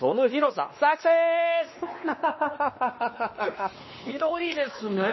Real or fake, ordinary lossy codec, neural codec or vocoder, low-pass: fake; MP3, 24 kbps; codec, 16 kHz, 2 kbps, X-Codec, WavLM features, trained on Multilingual LibriSpeech; 7.2 kHz